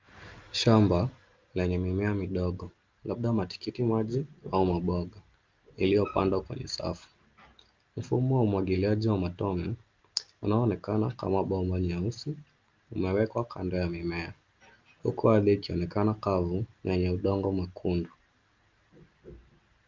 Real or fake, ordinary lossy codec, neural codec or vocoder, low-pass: real; Opus, 24 kbps; none; 7.2 kHz